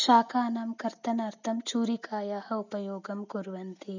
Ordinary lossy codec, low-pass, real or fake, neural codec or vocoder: none; 7.2 kHz; real; none